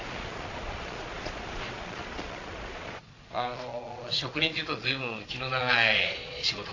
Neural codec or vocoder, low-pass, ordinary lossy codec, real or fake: vocoder, 22.05 kHz, 80 mel bands, Vocos; 7.2 kHz; AAC, 32 kbps; fake